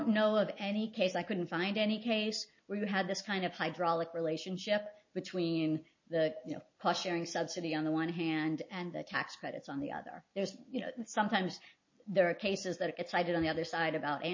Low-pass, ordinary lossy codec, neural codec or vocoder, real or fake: 7.2 kHz; MP3, 32 kbps; none; real